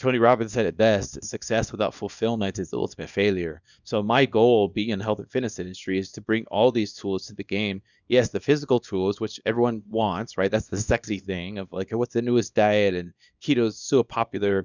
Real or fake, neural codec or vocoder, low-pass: fake; codec, 24 kHz, 0.9 kbps, WavTokenizer, small release; 7.2 kHz